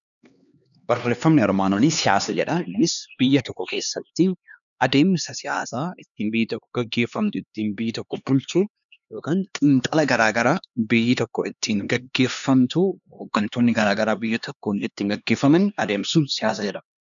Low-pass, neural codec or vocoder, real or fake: 7.2 kHz; codec, 16 kHz, 2 kbps, X-Codec, HuBERT features, trained on LibriSpeech; fake